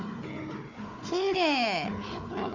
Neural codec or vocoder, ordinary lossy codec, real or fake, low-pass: codec, 16 kHz, 4 kbps, FunCodec, trained on Chinese and English, 50 frames a second; MP3, 64 kbps; fake; 7.2 kHz